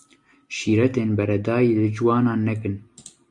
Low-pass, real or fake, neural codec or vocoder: 10.8 kHz; real; none